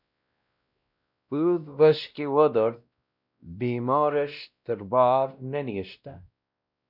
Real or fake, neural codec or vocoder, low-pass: fake; codec, 16 kHz, 1 kbps, X-Codec, WavLM features, trained on Multilingual LibriSpeech; 5.4 kHz